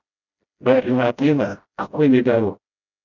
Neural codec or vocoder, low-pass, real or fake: codec, 16 kHz, 0.5 kbps, FreqCodec, smaller model; 7.2 kHz; fake